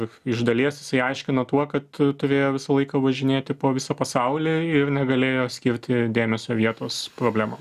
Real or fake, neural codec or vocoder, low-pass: real; none; 14.4 kHz